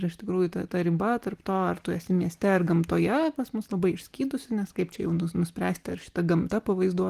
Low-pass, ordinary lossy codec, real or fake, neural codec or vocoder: 14.4 kHz; Opus, 24 kbps; real; none